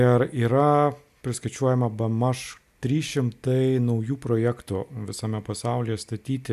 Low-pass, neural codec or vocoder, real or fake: 14.4 kHz; none; real